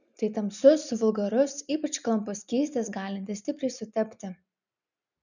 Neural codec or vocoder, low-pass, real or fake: none; 7.2 kHz; real